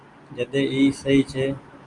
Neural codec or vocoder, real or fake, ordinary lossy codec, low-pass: none; real; Opus, 32 kbps; 10.8 kHz